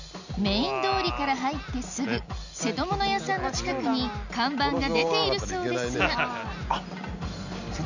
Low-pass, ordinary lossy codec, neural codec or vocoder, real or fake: 7.2 kHz; none; none; real